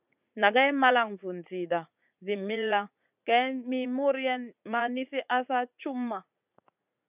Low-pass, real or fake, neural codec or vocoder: 3.6 kHz; fake; vocoder, 44.1 kHz, 80 mel bands, Vocos